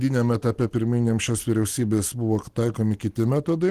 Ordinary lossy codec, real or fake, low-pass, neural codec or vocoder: Opus, 16 kbps; real; 14.4 kHz; none